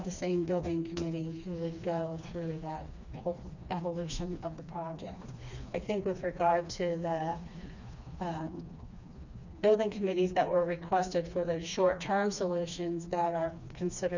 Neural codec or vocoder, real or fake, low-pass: codec, 16 kHz, 2 kbps, FreqCodec, smaller model; fake; 7.2 kHz